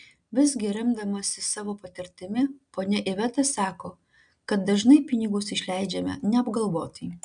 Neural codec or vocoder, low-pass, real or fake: none; 9.9 kHz; real